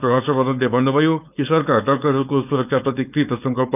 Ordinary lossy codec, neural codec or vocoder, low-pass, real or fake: none; codec, 16 kHz, 4.8 kbps, FACodec; 3.6 kHz; fake